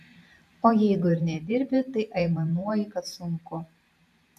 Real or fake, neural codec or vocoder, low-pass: fake; vocoder, 44.1 kHz, 128 mel bands every 512 samples, BigVGAN v2; 14.4 kHz